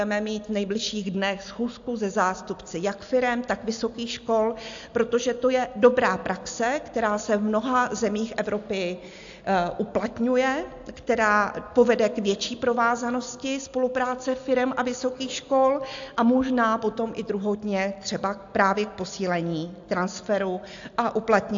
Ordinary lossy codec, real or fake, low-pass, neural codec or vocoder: AAC, 64 kbps; real; 7.2 kHz; none